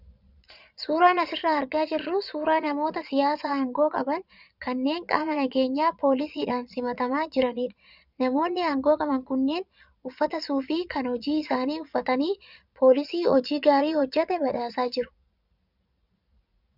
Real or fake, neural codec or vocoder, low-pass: fake; vocoder, 22.05 kHz, 80 mel bands, Vocos; 5.4 kHz